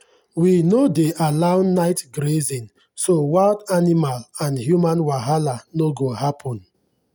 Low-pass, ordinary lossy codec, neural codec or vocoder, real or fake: none; none; none; real